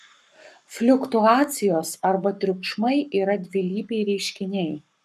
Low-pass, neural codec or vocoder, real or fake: 14.4 kHz; codec, 44.1 kHz, 7.8 kbps, Pupu-Codec; fake